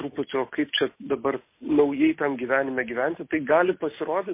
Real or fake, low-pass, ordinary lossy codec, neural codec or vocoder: real; 3.6 kHz; MP3, 24 kbps; none